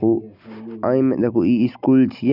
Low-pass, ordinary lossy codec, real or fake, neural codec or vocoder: 5.4 kHz; none; real; none